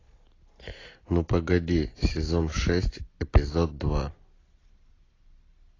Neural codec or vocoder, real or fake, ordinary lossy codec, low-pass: none; real; AAC, 32 kbps; 7.2 kHz